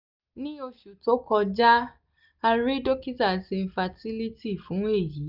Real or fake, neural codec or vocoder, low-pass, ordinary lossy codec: real; none; 5.4 kHz; none